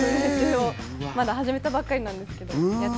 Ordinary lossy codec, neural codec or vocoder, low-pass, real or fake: none; none; none; real